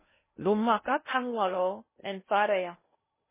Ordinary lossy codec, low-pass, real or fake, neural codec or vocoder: MP3, 16 kbps; 3.6 kHz; fake; codec, 16 kHz in and 24 kHz out, 0.6 kbps, FocalCodec, streaming, 2048 codes